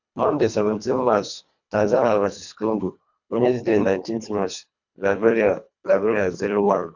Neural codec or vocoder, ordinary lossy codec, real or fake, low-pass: codec, 24 kHz, 1.5 kbps, HILCodec; none; fake; 7.2 kHz